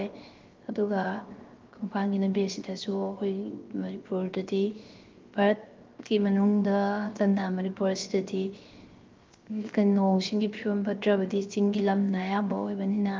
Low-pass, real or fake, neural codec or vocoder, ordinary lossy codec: 7.2 kHz; fake; codec, 16 kHz, 0.7 kbps, FocalCodec; Opus, 32 kbps